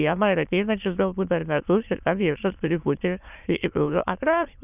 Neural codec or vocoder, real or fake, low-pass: autoencoder, 22.05 kHz, a latent of 192 numbers a frame, VITS, trained on many speakers; fake; 3.6 kHz